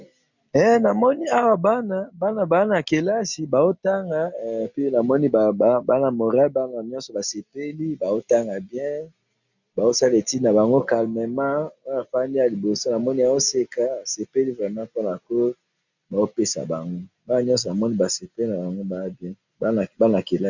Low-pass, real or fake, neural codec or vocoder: 7.2 kHz; real; none